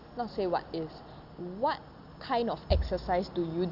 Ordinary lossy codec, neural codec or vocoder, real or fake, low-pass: Opus, 64 kbps; none; real; 5.4 kHz